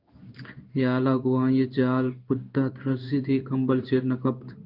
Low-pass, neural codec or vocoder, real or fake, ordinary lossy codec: 5.4 kHz; codec, 16 kHz in and 24 kHz out, 1 kbps, XY-Tokenizer; fake; Opus, 32 kbps